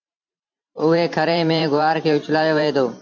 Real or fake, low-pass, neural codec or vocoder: fake; 7.2 kHz; vocoder, 44.1 kHz, 128 mel bands every 512 samples, BigVGAN v2